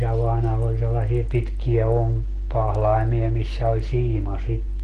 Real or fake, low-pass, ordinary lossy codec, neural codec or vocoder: real; 10.8 kHz; Opus, 16 kbps; none